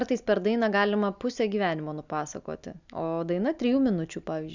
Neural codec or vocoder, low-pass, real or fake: none; 7.2 kHz; real